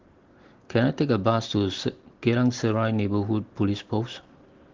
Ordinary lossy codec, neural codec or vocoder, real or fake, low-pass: Opus, 16 kbps; none; real; 7.2 kHz